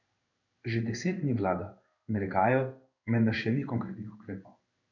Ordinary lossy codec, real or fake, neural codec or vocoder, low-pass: none; fake; codec, 16 kHz in and 24 kHz out, 1 kbps, XY-Tokenizer; 7.2 kHz